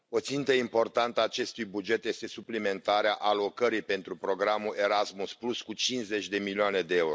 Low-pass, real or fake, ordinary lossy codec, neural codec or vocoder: none; real; none; none